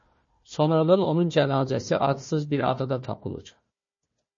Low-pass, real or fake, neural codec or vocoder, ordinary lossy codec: 7.2 kHz; fake; codec, 16 kHz, 1 kbps, FunCodec, trained on Chinese and English, 50 frames a second; MP3, 32 kbps